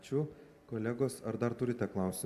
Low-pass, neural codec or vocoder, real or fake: 14.4 kHz; none; real